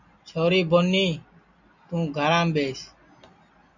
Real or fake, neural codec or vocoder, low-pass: real; none; 7.2 kHz